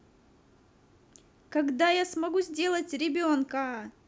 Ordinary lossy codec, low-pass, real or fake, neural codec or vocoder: none; none; real; none